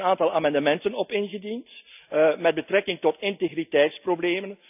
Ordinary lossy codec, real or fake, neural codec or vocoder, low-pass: none; real; none; 3.6 kHz